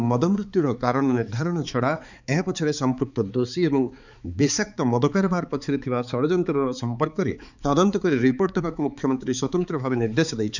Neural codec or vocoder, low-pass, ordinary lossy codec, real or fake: codec, 16 kHz, 4 kbps, X-Codec, HuBERT features, trained on balanced general audio; 7.2 kHz; none; fake